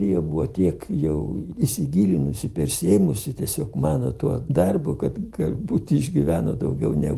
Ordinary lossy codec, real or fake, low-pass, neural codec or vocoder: Opus, 32 kbps; real; 14.4 kHz; none